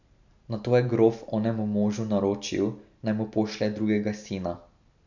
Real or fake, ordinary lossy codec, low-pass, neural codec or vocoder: real; none; 7.2 kHz; none